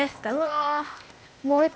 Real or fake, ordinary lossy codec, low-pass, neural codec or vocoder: fake; none; none; codec, 16 kHz, 0.8 kbps, ZipCodec